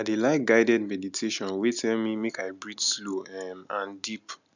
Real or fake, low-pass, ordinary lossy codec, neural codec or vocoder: real; 7.2 kHz; none; none